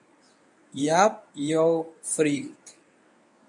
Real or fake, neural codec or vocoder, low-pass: fake; codec, 24 kHz, 0.9 kbps, WavTokenizer, medium speech release version 1; 10.8 kHz